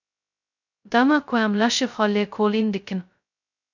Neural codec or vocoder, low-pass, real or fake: codec, 16 kHz, 0.2 kbps, FocalCodec; 7.2 kHz; fake